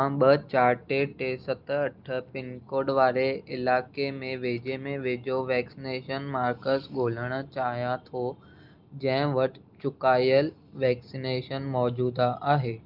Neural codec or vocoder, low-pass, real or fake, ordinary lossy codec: none; 5.4 kHz; real; Opus, 32 kbps